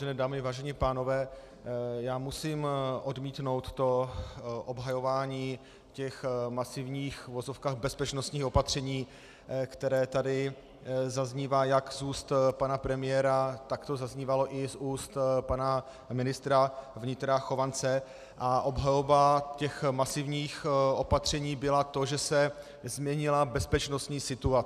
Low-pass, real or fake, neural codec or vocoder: 14.4 kHz; real; none